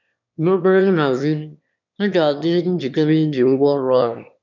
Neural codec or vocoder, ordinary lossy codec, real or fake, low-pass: autoencoder, 22.05 kHz, a latent of 192 numbers a frame, VITS, trained on one speaker; none; fake; 7.2 kHz